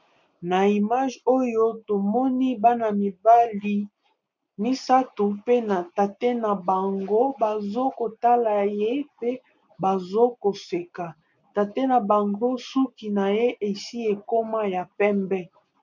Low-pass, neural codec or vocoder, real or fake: 7.2 kHz; none; real